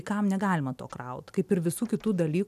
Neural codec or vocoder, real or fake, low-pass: none; real; 14.4 kHz